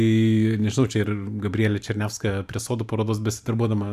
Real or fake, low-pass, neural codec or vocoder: fake; 14.4 kHz; vocoder, 44.1 kHz, 128 mel bands every 512 samples, BigVGAN v2